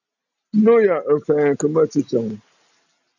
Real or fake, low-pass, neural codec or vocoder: real; 7.2 kHz; none